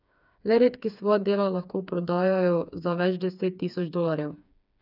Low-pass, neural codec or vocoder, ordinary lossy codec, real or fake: 5.4 kHz; codec, 16 kHz, 4 kbps, FreqCodec, smaller model; none; fake